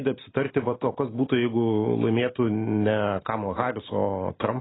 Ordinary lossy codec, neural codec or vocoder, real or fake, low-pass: AAC, 16 kbps; none; real; 7.2 kHz